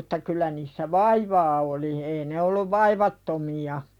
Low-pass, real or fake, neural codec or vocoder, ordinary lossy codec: 19.8 kHz; real; none; none